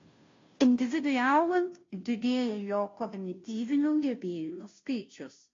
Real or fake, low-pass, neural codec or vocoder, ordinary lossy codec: fake; 7.2 kHz; codec, 16 kHz, 0.5 kbps, FunCodec, trained on Chinese and English, 25 frames a second; AAC, 32 kbps